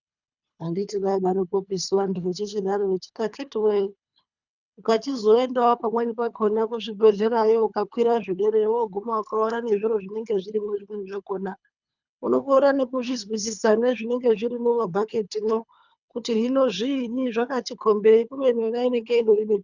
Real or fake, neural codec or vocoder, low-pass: fake; codec, 24 kHz, 3 kbps, HILCodec; 7.2 kHz